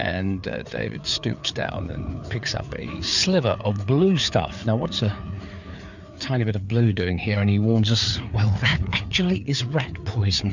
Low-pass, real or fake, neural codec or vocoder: 7.2 kHz; fake; codec, 16 kHz, 4 kbps, FreqCodec, larger model